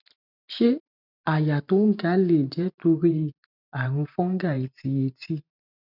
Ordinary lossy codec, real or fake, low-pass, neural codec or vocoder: none; fake; 5.4 kHz; vocoder, 44.1 kHz, 128 mel bands every 512 samples, BigVGAN v2